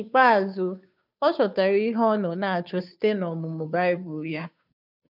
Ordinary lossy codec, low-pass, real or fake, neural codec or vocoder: none; 5.4 kHz; fake; codec, 16 kHz, 2 kbps, FunCodec, trained on Chinese and English, 25 frames a second